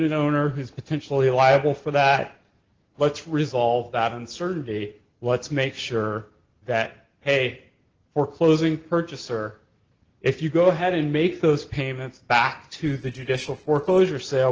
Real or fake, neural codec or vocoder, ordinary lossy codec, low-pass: real; none; Opus, 16 kbps; 7.2 kHz